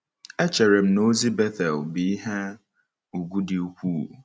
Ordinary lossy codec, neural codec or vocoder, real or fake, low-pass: none; none; real; none